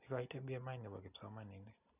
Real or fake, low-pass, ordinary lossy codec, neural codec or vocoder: real; 3.6 kHz; none; none